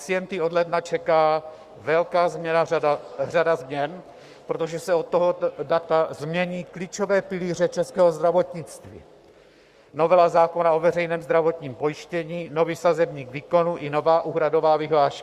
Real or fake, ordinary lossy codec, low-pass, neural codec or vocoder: fake; Opus, 64 kbps; 14.4 kHz; codec, 44.1 kHz, 7.8 kbps, Pupu-Codec